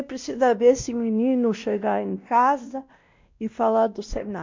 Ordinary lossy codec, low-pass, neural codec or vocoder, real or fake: MP3, 64 kbps; 7.2 kHz; codec, 16 kHz, 1 kbps, X-Codec, WavLM features, trained on Multilingual LibriSpeech; fake